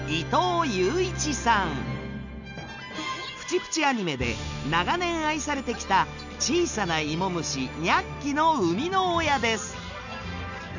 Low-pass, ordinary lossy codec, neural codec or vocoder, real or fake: 7.2 kHz; none; none; real